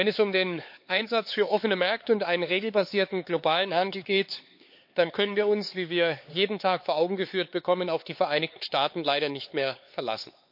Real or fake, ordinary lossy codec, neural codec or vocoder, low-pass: fake; MP3, 32 kbps; codec, 16 kHz, 4 kbps, X-Codec, HuBERT features, trained on LibriSpeech; 5.4 kHz